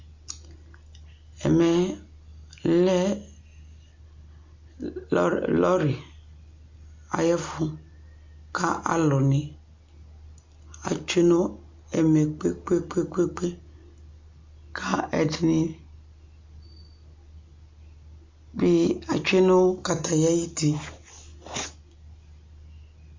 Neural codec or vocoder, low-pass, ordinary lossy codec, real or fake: none; 7.2 kHz; MP3, 48 kbps; real